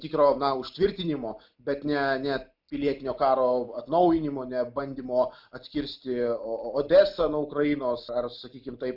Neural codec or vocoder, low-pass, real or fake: none; 5.4 kHz; real